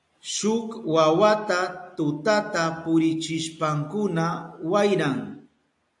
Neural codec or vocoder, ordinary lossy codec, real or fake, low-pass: none; AAC, 64 kbps; real; 10.8 kHz